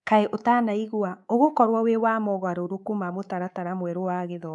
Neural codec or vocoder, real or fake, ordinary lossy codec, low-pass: codec, 24 kHz, 3.1 kbps, DualCodec; fake; none; 10.8 kHz